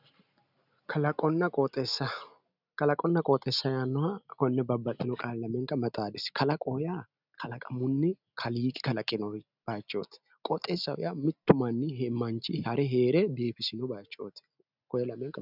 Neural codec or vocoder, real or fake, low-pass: none; real; 5.4 kHz